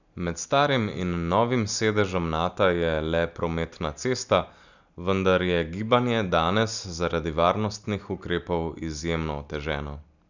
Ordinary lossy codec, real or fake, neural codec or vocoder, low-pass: none; real; none; 7.2 kHz